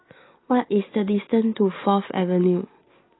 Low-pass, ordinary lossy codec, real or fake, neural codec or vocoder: 7.2 kHz; AAC, 16 kbps; real; none